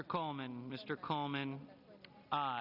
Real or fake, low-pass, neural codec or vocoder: real; 5.4 kHz; none